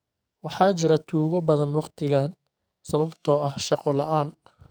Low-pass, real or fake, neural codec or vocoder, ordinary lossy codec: none; fake; codec, 44.1 kHz, 2.6 kbps, SNAC; none